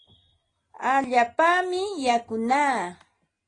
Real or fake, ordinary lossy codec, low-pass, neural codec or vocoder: real; AAC, 32 kbps; 9.9 kHz; none